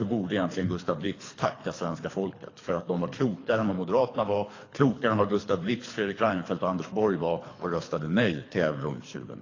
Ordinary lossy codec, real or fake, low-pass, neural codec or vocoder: AAC, 32 kbps; fake; 7.2 kHz; codec, 24 kHz, 3 kbps, HILCodec